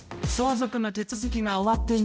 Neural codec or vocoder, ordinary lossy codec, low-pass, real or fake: codec, 16 kHz, 0.5 kbps, X-Codec, HuBERT features, trained on balanced general audio; none; none; fake